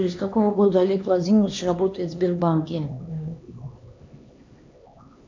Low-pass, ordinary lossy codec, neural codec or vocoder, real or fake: 7.2 kHz; MP3, 64 kbps; codec, 16 kHz, 2 kbps, X-Codec, HuBERT features, trained on LibriSpeech; fake